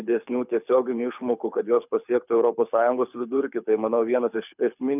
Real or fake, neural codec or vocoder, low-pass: fake; codec, 24 kHz, 6 kbps, HILCodec; 3.6 kHz